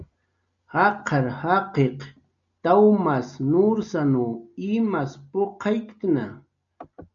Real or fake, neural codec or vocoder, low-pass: real; none; 7.2 kHz